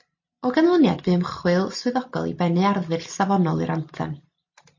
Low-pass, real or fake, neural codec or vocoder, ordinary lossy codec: 7.2 kHz; real; none; MP3, 32 kbps